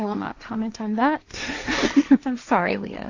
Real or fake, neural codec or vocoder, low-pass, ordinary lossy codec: fake; codec, 16 kHz, 1.1 kbps, Voila-Tokenizer; 7.2 kHz; AAC, 48 kbps